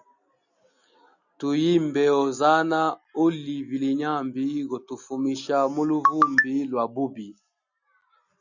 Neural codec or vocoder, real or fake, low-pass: none; real; 7.2 kHz